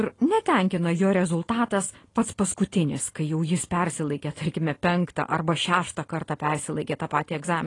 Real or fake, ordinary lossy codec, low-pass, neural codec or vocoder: real; AAC, 32 kbps; 10.8 kHz; none